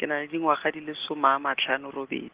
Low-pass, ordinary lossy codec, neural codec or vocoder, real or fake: 3.6 kHz; Opus, 16 kbps; none; real